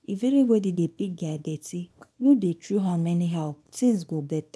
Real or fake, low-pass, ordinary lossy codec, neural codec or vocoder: fake; none; none; codec, 24 kHz, 0.9 kbps, WavTokenizer, small release